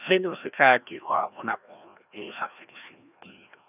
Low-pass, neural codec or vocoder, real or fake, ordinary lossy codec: 3.6 kHz; codec, 16 kHz, 1 kbps, FreqCodec, larger model; fake; none